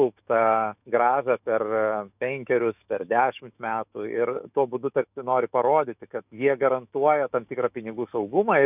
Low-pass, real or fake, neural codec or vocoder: 3.6 kHz; fake; autoencoder, 48 kHz, 128 numbers a frame, DAC-VAE, trained on Japanese speech